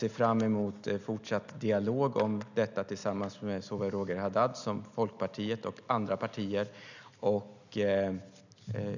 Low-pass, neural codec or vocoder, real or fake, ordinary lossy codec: 7.2 kHz; none; real; none